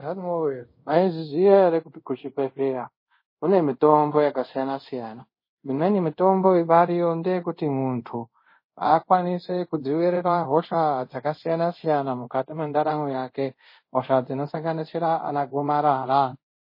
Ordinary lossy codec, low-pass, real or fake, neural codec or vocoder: MP3, 24 kbps; 5.4 kHz; fake; codec, 24 kHz, 0.5 kbps, DualCodec